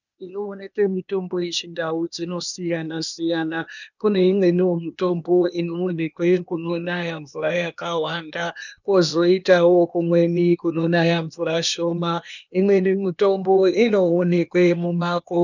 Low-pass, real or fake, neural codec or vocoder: 7.2 kHz; fake; codec, 16 kHz, 0.8 kbps, ZipCodec